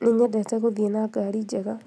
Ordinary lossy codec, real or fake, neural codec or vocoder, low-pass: none; real; none; none